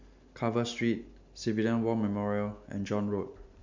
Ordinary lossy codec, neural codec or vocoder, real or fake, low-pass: MP3, 64 kbps; none; real; 7.2 kHz